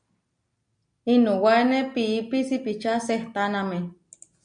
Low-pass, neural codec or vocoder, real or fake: 9.9 kHz; none; real